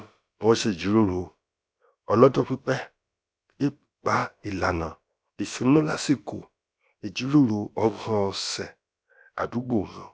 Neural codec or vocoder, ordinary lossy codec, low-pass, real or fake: codec, 16 kHz, about 1 kbps, DyCAST, with the encoder's durations; none; none; fake